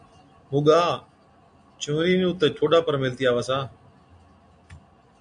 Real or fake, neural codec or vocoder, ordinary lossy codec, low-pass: real; none; AAC, 64 kbps; 9.9 kHz